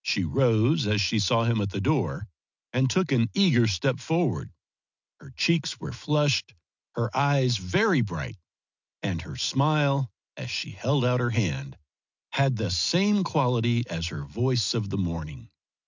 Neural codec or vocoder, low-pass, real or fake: none; 7.2 kHz; real